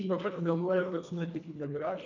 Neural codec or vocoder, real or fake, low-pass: codec, 24 kHz, 1.5 kbps, HILCodec; fake; 7.2 kHz